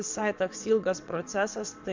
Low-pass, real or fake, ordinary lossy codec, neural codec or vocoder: 7.2 kHz; fake; MP3, 64 kbps; vocoder, 22.05 kHz, 80 mel bands, WaveNeXt